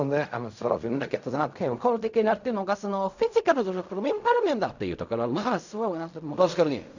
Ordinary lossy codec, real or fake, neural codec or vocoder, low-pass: none; fake; codec, 16 kHz in and 24 kHz out, 0.4 kbps, LongCat-Audio-Codec, fine tuned four codebook decoder; 7.2 kHz